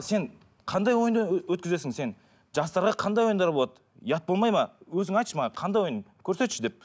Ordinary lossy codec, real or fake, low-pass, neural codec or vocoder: none; real; none; none